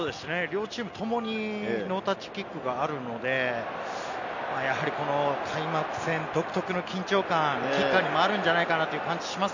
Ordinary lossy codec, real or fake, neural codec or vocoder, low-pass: none; real; none; 7.2 kHz